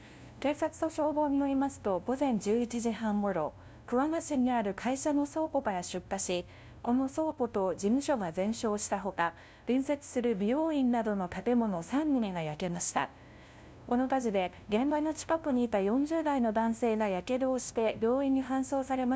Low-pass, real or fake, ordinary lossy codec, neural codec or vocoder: none; fake; none; codec, 16 kHz, 0.5 kbps, FunCodec, trained on LibriTTS, 25 frames a second